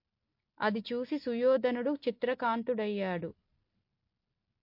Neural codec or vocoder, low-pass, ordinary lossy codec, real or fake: none; 5.4 kHz; MP3, 32 kbps; real